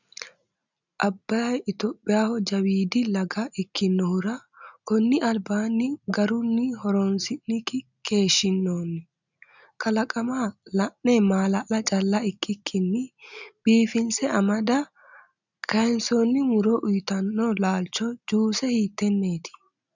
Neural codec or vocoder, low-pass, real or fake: none; 7.2 kHz; real